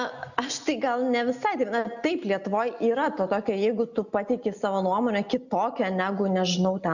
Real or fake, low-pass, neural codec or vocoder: real; 7.2 kHz; none